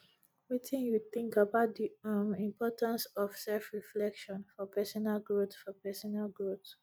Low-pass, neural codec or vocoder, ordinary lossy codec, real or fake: none; none; none; real